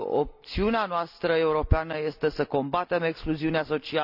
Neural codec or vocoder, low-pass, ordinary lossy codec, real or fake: none; 5.4 kHz; none; real